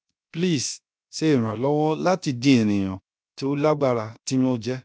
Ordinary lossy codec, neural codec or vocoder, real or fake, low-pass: none; codec, 16 kHz, about 1 kbps, DyCAST, with the encoder's durations; fake; none